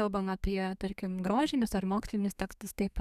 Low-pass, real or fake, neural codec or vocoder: 14.4 kHz; fake; codec, 32 kHz, 1.9 kbps, SNAC